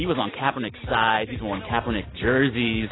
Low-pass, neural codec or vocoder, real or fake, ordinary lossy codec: 7.2 kHz; none; real; AAC, 16 kbps